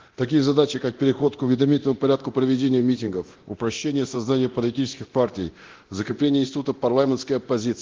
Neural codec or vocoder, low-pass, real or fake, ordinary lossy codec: codec, 24 kHz, 0.9 kbps, DualCodec; 7.2 kHz; fake; Opus, 16 kbps